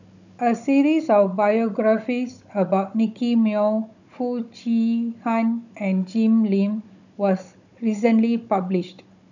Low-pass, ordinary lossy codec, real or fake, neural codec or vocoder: 7.2 kHz; none; fake; codec, 16 kHz, 16 kbps, FunCodec, trained on Chinese and English, 50 frames a second